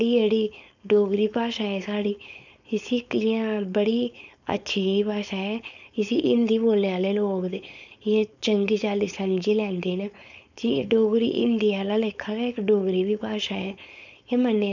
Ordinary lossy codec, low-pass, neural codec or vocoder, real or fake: none; 7.2 kHz; codec, 16 kHz, 4.8 kbps, FACodec; fake